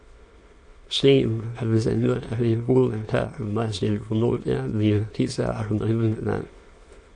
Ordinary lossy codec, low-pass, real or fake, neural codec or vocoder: AAC, 48 kbps; 9.9 kHz; fake; autoencoder, 22.05 kHz, a latent of 192 numbers a frame, VITS, trained on many speakers